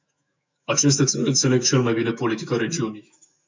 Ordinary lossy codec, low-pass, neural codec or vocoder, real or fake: MP3, 64 kbps; 7.2 kHz; autoencoder, 48 kHz, 128 numbers a frame, DAC-VAE, trained on Japanese speech; fake